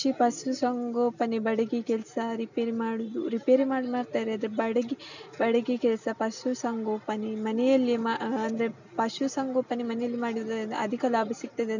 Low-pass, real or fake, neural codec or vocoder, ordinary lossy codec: 7.2 kHz; real; none; none